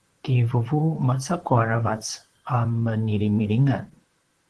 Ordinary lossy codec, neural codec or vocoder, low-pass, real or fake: Opus, 16 kbps; vocoder, 44.1 kHz, 128 mel bands, Pupu-Vocoder; 10.8 kHz; fake